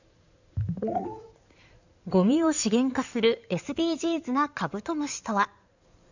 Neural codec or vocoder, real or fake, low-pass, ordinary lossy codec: codec, 16 kHz in and 24 kHz out, 2.2 kbps, FireRedTTS-2 codec; fake; 7.2 kHz; none